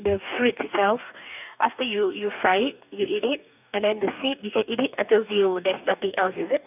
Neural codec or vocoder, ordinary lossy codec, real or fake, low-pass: codec, 44.1 kHz, 2.6 kbps, DAC; none; fake; 3.6 kHz